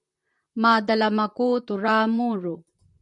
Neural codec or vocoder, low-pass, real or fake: vocoder, 44.1 kHz, 128 mel bands, Pupu-Vocoder; 10.8 kHz; fake